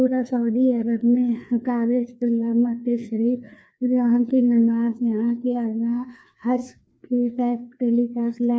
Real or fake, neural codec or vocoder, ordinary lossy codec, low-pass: fake; codec, 16 kHz, 2 kbps, FreqCodec, larger model; none; none